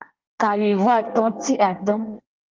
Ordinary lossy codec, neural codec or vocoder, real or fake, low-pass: Opus, 24 kbps; codec, 24 kHz, 1 kbps, SNAC; fake; 7.2 kHz